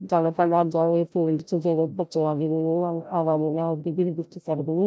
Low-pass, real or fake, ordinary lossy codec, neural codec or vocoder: none; fake; none; codec, 16 kHz, 0.5 kbps, FreqCodec, larger model